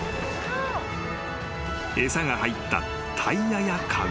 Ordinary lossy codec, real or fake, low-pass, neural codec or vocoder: none; real; none; none